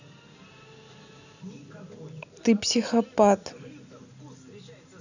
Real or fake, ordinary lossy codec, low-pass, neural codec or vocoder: real; none; 7.2 kHz; none